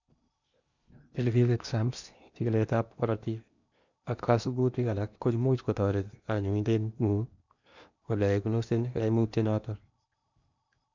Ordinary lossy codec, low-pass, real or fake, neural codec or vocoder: none; 7.2 kHz; fake; codec, 16 kHz in and 24 kHz out, 0.8 kbps, FocalCodec, streaming, 65536 codes